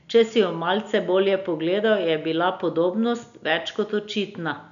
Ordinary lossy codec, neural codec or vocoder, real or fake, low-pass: none; none; real; 7.2 kHz